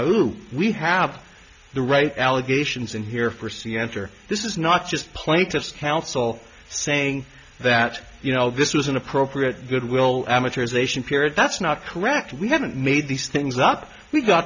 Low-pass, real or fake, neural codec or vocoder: 7.2 kHz; real; none